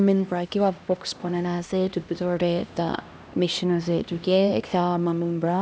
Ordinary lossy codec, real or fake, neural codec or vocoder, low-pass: none; fake; codec, 16 kHz, 1 kbps, X-Codec, HuBERT features, trained on LibriSpeech; none